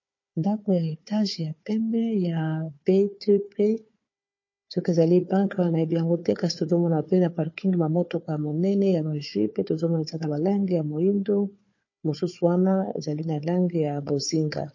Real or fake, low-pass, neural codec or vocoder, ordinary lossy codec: fake; 7.2 kHz; codec, 16 kHz, 4 kbps, FunCodec, trained on Chinese and English, 50 frames a second; MP3, 32 kbps